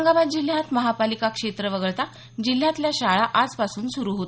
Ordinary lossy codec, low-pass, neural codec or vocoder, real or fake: none; none; none; real